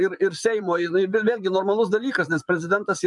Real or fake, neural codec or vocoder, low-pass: fake; vocoder, 24 kHz, 100 mel bands, Vocos; 10.8 kHz